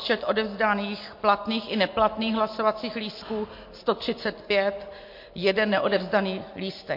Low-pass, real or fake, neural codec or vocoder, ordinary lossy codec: 5.4 kHz; real; none; MP3, 32 kbps